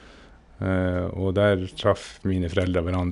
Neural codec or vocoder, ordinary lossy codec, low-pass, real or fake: none; none; 10.8 kHz; real